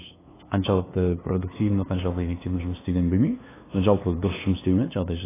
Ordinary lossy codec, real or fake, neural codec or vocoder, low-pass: AAC, 16 kbps; fake; codec, 16 kHz, 2 kbps, FunCodec, trained on LibriTTS, 25 frames a second; 3.6 kHz